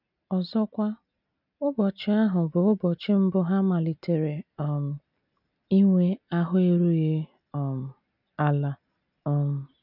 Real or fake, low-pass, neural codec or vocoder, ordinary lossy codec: real; 5.4 kHz; none; none